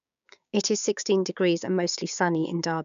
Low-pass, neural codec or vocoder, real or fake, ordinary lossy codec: 7.2 kHz; codec, 16 kHz, 6 kbps, DAC; fake; none